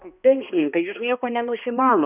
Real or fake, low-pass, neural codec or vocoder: fake; 3.6 kHz; codec, 16 kHz, 1 kbps, X-Codec, HuBERT features, trained on balanced general audio